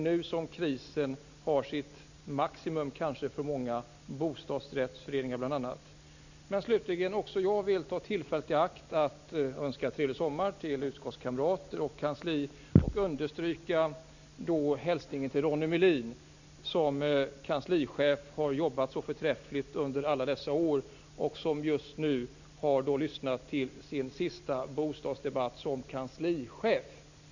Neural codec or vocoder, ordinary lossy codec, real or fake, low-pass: none; none; real; 7.2 kHz